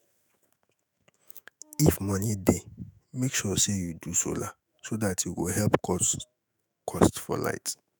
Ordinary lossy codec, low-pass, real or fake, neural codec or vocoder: none; none; fake; autoencoder, 48 kHz, 128 numbers a frame, DAC-VAE, trained on Japanese speech